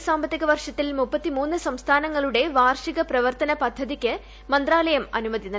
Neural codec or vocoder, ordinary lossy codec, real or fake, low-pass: none; none; real; none